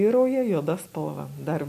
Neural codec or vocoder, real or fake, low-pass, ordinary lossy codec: none; real; 14.4 kHz; MP3, 64 kbps